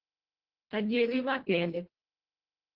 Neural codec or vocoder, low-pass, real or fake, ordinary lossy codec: codec, 24 kHz, 1.5 kbps, HILCodec; 5.4 kHz; fake; Opus, 16 kbps